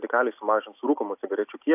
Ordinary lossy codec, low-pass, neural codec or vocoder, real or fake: AAC, 32 kbps; 3.6 kHz; none; real